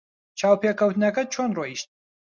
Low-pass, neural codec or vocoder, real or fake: 7.2 kHz; none; real